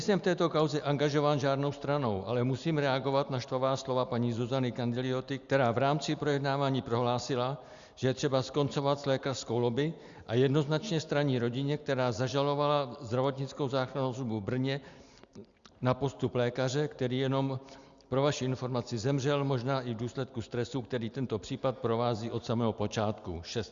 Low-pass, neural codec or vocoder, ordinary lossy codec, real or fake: 7.2 kHz; none; Opus, 64 kbps; real